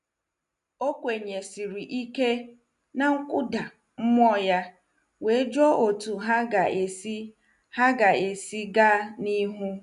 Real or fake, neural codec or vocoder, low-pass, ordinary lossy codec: real; none; 10.8 kHz; none